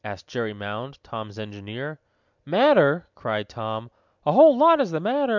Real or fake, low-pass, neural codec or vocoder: real; 7.2 kHz; none